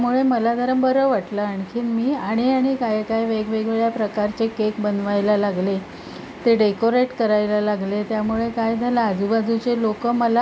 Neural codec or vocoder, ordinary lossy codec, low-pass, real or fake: none; none; none; real